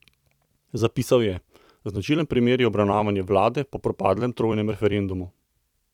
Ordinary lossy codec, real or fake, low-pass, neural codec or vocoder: none; fake; 19.8 kHz; vocoder, 44.1 kHz, 128 mel bands every 256 samples, BigVGAN v2